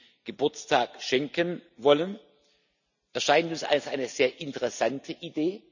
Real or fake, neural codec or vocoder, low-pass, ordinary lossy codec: real; none; 7.2 kHz; none